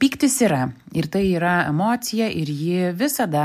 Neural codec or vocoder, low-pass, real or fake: none; 14.4 kHz; real